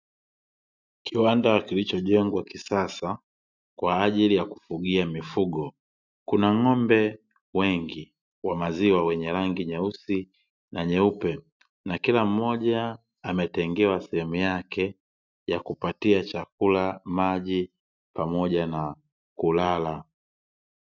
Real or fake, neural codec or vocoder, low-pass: real; none; 7.2 kHz